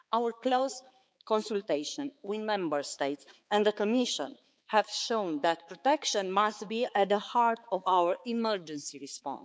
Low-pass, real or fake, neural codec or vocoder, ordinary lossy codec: none; fake; codec, 16 kHz, 4 kbps, X-Codec, HuBERT features, trained on balanced general audio; none